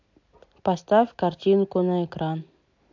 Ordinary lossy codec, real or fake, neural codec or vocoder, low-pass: AAC, 48 kbps; real; none; 7.2 kHz